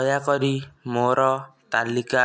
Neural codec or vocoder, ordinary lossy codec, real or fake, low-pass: none; none; real; none